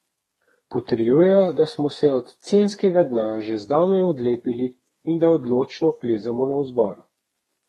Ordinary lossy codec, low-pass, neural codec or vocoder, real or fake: AAC, 32 kbps; 14.4 kHz; codec, 32 kHz, 1.9 kbps, SNAC; fake